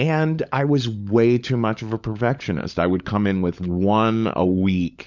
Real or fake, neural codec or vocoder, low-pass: fake; codec, 16 kHz, 16 kbps, FunCodec, trained on LibriTTS, 50 frames a second; 7.2 kHz